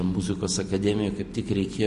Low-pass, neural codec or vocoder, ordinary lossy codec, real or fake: 14.4 kHz; none; MP3, 48 kbps; real